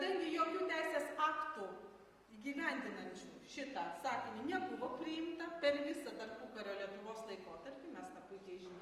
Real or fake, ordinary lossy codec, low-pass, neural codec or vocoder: real; Opus, 32 kbps; 14.4 kHz; none